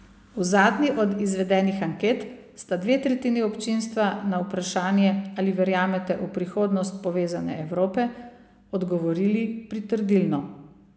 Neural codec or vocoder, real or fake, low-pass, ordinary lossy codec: none; real; none; none